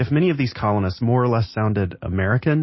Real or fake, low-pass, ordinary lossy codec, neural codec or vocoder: real; 7.2 kHz; MP3, 24 kbps; none